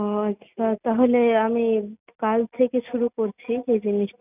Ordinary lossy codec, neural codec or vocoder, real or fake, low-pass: none; none; real; 3.6 kHz